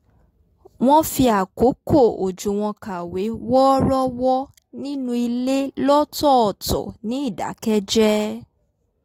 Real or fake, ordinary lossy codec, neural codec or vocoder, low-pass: real; AAC, 48 kbps; none; 19.8 kHz